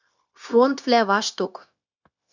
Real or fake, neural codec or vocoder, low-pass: fake; codec, 16 kHz, 0.9 kbps, LongCat-Audio-Codec; 7.2 kHz